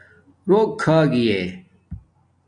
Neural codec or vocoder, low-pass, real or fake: none; 9.9 kHz; real